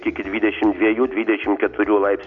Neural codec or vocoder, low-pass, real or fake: none; 7.2 kHz; real